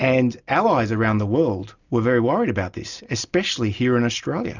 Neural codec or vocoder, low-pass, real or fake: none; 7.2 kHz; real